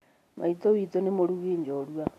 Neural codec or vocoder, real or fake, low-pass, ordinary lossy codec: none; real; 14.4 kHz; AAC, 64 kbps